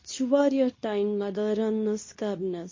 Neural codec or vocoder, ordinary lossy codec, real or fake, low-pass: codec, 24 kHz, 0.9 kbps, WavTokenizer, medium speech release version 2; MP3, 32 kbps; fake; 7.2 kHz